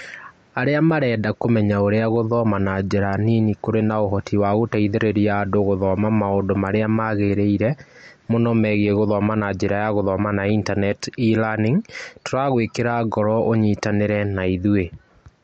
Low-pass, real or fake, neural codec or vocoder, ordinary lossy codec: 14.4 kHz; real; none; MP3, 48 kbps